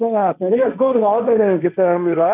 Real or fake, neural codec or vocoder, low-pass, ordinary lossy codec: fake; codec, 16 kHz, 1.1 kbps, Voila-Tokenizer; 3.6 kHz; none